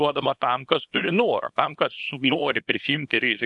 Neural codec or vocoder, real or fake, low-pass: codec, 24 kHz, 0.9 kbps, WavTokenizer, small release; fake; 10.8 kHz